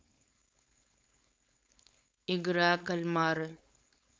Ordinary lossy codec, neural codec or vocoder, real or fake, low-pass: none; codec, 16 kHz, 4.8 kbps, FACodec; fake; none